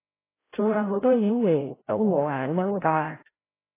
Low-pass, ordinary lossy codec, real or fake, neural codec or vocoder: 3.6 kHz; AAC, 16 kbps; fake; codec, 16 kHz, 0.5 kbps, FreqCodec, larger model